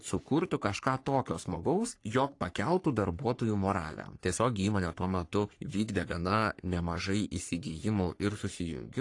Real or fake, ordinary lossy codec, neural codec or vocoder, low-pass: fake; AAC, 48 kbps; codec, 44.1 kHz, 3.4 kbps, Pupu-Codec; 10.8 kHz